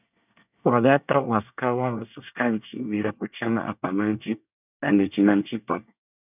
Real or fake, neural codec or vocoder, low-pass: fake; codec, 24 kHz, 1 kbps, SNAC; 3.6 kHz